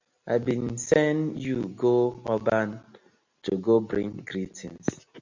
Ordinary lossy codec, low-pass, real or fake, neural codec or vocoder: MP3, 64 kbps; 7.2 kHz; real; none